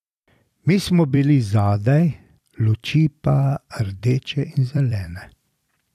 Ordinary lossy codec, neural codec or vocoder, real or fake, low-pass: none; vocoder, 44.1 kHz, 128 mel bands every 256 samples, BigVGAN v2; fake; 14.4 kHz